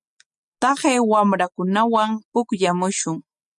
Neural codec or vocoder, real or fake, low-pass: none; real; 10.8 kHz